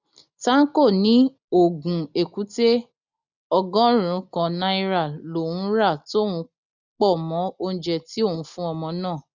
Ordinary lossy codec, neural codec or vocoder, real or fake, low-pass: none; none; real; 7.2 kHz